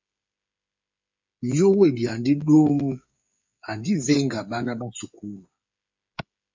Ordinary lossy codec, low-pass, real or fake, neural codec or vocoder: MP3, 48 kbps; 7.2 kHz; fake; codec, 16 kHz, 8 kbps, FreqCodec, smaller model